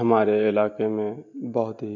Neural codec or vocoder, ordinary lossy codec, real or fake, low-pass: none; none; real; 7.2 kHz